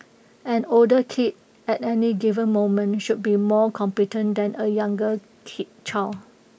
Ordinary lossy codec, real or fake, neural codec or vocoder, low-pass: none; real; none; none